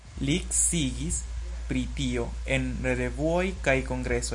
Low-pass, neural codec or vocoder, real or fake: 10.8 kHz; none; real